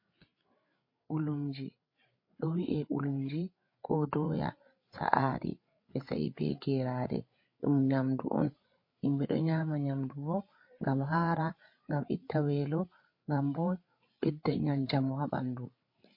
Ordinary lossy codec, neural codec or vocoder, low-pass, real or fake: MP3, 32 kbps; codec, 16 kHz, 8 kbps, FreqCodec, larger model; 5.4 kHz; fake